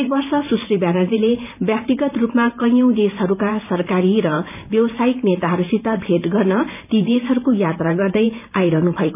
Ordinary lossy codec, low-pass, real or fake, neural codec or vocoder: none; 3.6 kHz; fake; vocoder, 44.1 kHz, 128 mel bands every 512 samples, BigVGAN v2